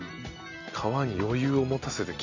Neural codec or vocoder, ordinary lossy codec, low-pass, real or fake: none; none; 7.2 kHz; real